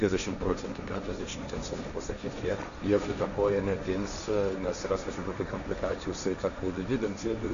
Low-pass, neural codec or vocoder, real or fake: 7.2 kHz; codec, 16 kHz, 1.1 kbps, Voila-Tokenizer; fake